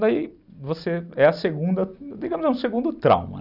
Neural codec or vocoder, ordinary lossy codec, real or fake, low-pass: none; none; real; 5.4 kHz